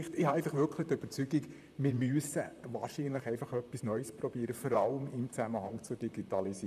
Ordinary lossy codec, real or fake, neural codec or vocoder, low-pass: none; fake; vocoder, 44.1 kHz, 128 mel bands, Pupu-Vocoder; 14.4 kHz